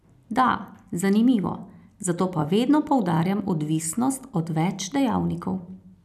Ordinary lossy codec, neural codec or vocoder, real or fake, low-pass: none; none; real; 14.4 kHz